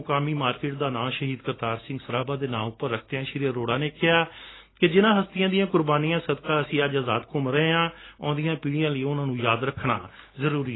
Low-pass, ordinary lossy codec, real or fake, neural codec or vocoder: 7.2 kHz; AAC, 16 kbps; real; none